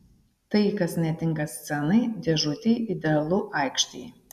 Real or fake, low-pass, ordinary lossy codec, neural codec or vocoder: real; 14.4 kHz; AAC, 96 kbps; none